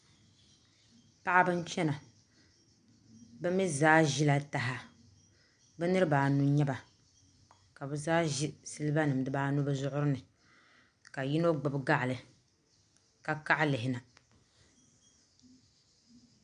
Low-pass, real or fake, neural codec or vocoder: 9.9 kHz; real; none